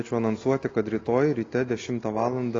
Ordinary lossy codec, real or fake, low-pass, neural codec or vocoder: AAC, 32 kbps; real; 7.2 kHz; none